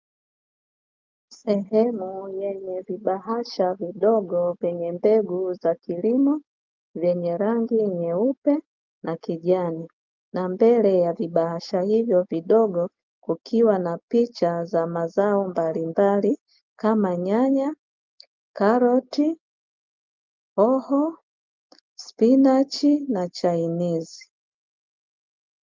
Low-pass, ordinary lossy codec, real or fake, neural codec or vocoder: 7.2 kHz; Opus, 24 kbps; real; none